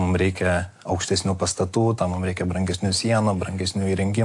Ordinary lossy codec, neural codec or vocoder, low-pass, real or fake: AAC, 64 kbps; none; 10.8 kHz; real